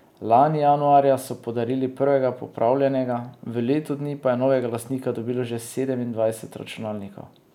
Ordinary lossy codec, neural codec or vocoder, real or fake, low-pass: none; none; real; 19.8 kHz